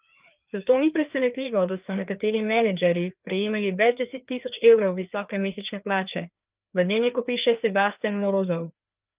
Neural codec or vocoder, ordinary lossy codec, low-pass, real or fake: codec, 16 kHz, 2 kbps, FreqCodec, larger model; Opus, 24 kbps; 3.6 kHz; fake